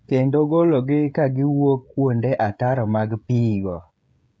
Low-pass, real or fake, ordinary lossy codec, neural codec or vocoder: none; fake; none; codec, 16 kHz, 16 kbps, FreqCodec, smaller model